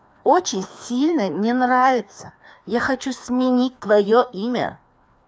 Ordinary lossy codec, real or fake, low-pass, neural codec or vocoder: none; fake; none; codec, 16 kHz, 2 kbps, FreqCodec, larger model